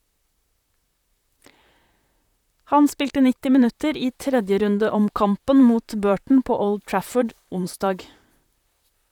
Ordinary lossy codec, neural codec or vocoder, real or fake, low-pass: none; vocoder, 44.1 kHz, 128 mel bands, Pupu-Vocoder; fake; 19.8 kHz